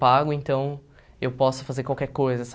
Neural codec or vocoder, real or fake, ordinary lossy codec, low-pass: none; real; none; none